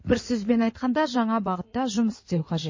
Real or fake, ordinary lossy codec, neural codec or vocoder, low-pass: fake; MP3, 32 kbps; codec, 16 kHz in and 24 kHz out, 2.2 kbps, FireRedTTS-2 codec; 7.2 kHz